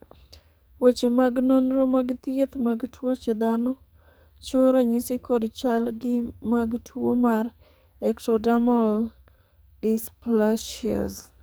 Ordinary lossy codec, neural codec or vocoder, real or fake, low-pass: none; codec, 44.1 kHz, 2.6 kbps, SNAC; fake; none